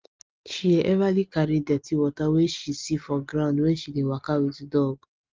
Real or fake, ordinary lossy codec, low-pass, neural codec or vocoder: real; Opus, 32 kbps; 7.2 kHz; none